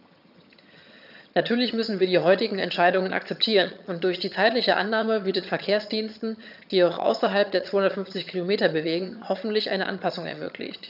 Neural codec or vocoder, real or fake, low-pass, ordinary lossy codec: vocoder, 22.05 kHz, 80 mel bands, HiFi-GAN; fake; 5.4 kHz; none